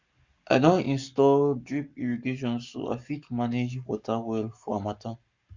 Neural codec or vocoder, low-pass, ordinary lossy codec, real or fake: codec, 44.1 kHz, 7.8 kbps, Pupu-Codec; 7.2 kHz; Opus, 64 kbps; fake